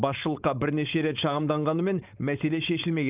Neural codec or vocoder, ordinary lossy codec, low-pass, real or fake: codec, 16 kHz, 4.8 kbps, FACodec; Opus, 24 kbps; 3.6 kHz; fake